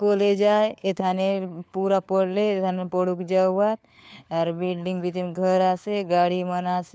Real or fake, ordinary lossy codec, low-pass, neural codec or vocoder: fake; none; none; codec, 16 kHz, 4 kbps, FunCodec, trained on LibriTTS, 50 frames a second